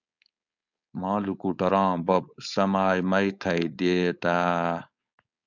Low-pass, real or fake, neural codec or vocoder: 7.2 kHz; fake; codec, 16 kHz, 4.8 kbps, FACodec